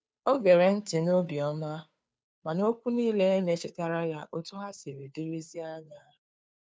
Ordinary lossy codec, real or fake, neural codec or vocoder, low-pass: none; fake; codec, 16 kHz, 2 kbps, FunCodec, trained on Chinese and English, 25 frames a second; none